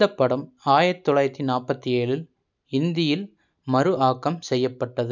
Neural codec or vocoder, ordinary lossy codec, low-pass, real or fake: none; none; 7.2 kHz; real